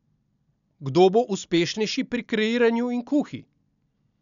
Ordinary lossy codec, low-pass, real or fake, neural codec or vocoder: none; 7.2 kHz; real; none